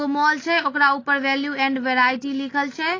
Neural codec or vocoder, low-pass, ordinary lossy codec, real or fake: none; 7.2 kHz; AAC, 32 kbps; real